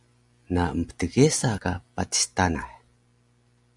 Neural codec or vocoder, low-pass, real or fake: none; 10.8 kHz; real